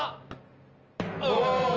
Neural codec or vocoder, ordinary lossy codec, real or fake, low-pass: none; Opus, 24 kbps; real; 7.2 kHz